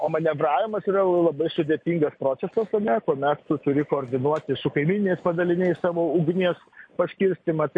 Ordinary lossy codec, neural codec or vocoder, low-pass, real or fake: MP3, 48 kbps; none; 9.9 kHz; real